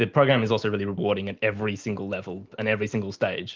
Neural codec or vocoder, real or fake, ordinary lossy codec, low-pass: none; real; Opus, 24 kbps; 7.2 kHz